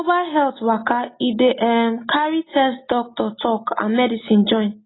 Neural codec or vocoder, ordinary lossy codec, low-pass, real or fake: none; AAC, 16 kbps; 7.2 kHz; real